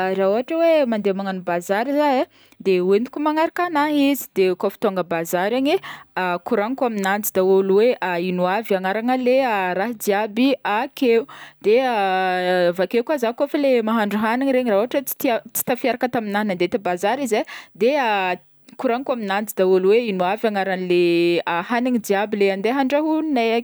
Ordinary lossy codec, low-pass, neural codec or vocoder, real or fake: none; none; none; real